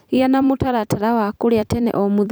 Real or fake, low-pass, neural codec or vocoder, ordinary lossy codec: fake; none; vocoder, 44.1 kHz, 128 mel bands every 256 samples, BigVGAN v2; none